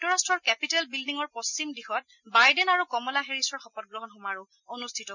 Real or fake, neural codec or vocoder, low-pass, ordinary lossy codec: real; none; 7.2 kHz; none